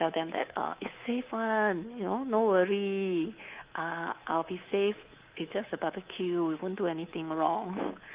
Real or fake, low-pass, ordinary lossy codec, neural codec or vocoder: fake; 3.6 kHz; Opus, 32 kbps; codec, 24 kHz, 3.1 kbps, DualCodec